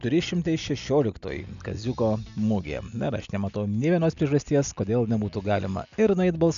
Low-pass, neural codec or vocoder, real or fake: 7.2 kHz; none; real